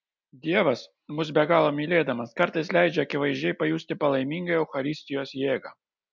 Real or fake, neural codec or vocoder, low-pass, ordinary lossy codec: real; none; 7.2 kHz; MP3, 64 kbps